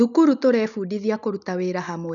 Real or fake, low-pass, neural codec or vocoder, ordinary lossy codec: real; 7.2 kHz; none; none